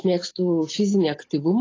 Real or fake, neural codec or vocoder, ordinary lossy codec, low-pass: real; none; AAC, 32 kbps; 7.2 kHz